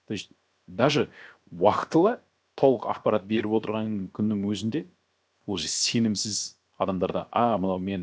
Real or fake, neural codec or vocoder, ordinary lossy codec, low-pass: fake; codec, 16 kHz, 0.7 kbps, FocalCodec; none; none